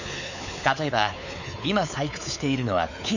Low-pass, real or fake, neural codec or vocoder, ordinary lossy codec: 7.2 kHz; fake; codec, 16 kHz, 4 kbps, X-Codec, WavLM features, trained on Multilingual LibriSpeech; none